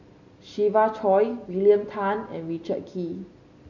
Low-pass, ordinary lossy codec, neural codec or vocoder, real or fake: 7.2 kHz; none; none; real